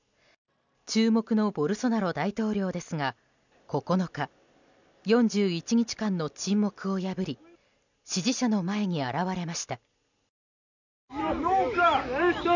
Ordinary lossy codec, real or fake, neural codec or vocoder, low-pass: none; real; none; 7.2 kHz